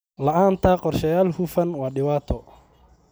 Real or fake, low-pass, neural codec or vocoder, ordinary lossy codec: real; none; none; none